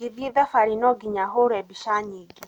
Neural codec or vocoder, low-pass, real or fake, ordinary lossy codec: none; 19.8 kHz; real; none